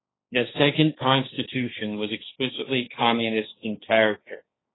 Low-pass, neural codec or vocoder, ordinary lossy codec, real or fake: 7.2 kHz; codec, 16 kHz, 1.1 kbps, Voila-Tokenizer; AAC, 16 kbps; fake